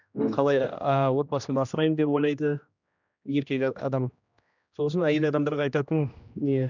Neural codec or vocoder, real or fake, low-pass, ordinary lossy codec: codec, 16 kHz, 1 kbps, X-Codec, HuBERT features, trained on general audio; fake; 7.2 kHz; none